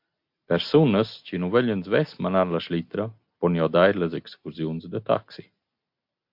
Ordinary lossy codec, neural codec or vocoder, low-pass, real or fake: MP3, 48 kbps; none; 5.4 kHz; real